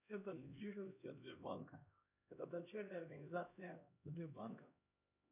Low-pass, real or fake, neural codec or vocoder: 3.6 kHz; fake; codec, 16 kHz, 1 kbps, X-Codec, HuBERT features, trained on LibriSpeech